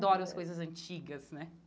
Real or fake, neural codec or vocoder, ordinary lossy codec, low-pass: real; none; none; none